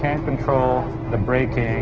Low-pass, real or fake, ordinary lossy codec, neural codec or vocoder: 7.2 kHz; real; Opus, 16 kbps; none